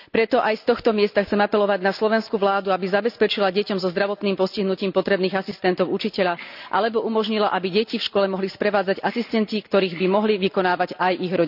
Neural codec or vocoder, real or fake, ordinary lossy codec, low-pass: none; real; none; 5.4 kHz